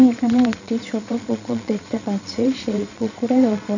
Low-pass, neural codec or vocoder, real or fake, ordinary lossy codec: 7.2 kHz; vocoder, 22.05 kHz, 80 mel bands, Vocos; fake; none